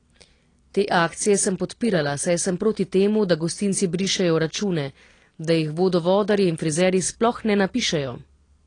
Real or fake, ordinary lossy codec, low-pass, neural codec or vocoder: real; AAC, 32 kbps; 9.9 kHz; none